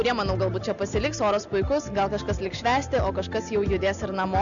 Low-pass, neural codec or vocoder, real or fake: 7.2 kHz; none; real